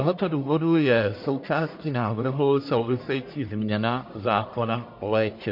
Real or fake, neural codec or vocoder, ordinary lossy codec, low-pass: fake; codec, 44.1 kHz, 1.7 kbps, Pupu-Codec; MP3, 32 kbps; 5.4 kHz